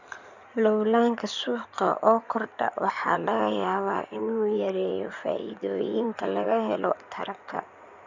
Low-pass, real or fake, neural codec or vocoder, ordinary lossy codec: 7.2 kHz; fake; codec, 16 kHz in and 24 kHz out, 2.2 kbps, FireRedTTS-2 codec; none